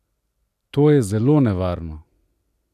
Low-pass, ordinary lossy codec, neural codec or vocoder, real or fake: 14.4 kHz; none; none; real